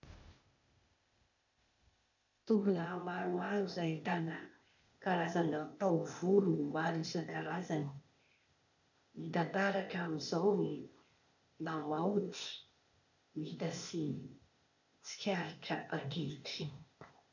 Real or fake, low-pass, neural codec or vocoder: fake; 7.2 kHz; codec, 16 kHz, 0.8 kbps, ZipCodec